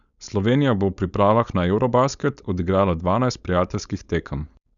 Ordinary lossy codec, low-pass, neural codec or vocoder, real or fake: none; 7.2 kHz; codec, 16 kHz, 4.8 kbps, FACodec; fake